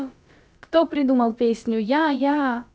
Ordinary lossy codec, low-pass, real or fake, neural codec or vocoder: none; none; fake; codec, 16 kHz, about 1 kbps, DyCAST, with the encoder's durations